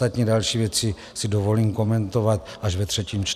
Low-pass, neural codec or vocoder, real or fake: 14.4 kHz; none; real